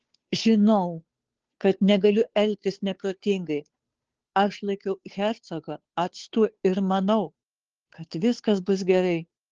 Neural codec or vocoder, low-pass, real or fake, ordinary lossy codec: codec, 16 kHz, 2 kbps, FunCodec, trained on Chinese and English, 25 frames a second; 7.2 kHz; fake; Opus, 16 kbps